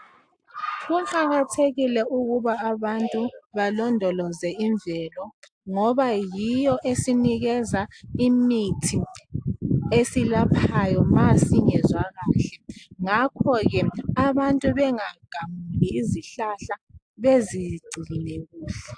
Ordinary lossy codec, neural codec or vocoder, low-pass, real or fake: AAC, 64 kbps; none; 9.9 kHz; real